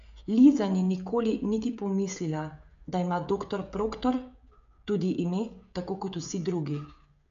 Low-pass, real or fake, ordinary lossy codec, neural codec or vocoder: 7.2 kHz; fake; MP3, 64 kbps; codec, 16 kHz, 16 kbps, FreqCodec, smaller model